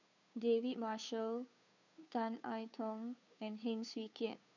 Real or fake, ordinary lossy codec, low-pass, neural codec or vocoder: fake; none; 7.2 kHz; codec, 16 kHz, 2 kbps, FunCodec, trained on Chinese and English, 25 frames a second